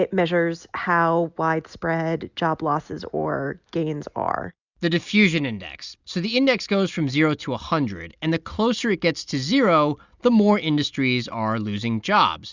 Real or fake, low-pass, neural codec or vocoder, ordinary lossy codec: real; 7.2 kHz; none; Opus, 64 kbps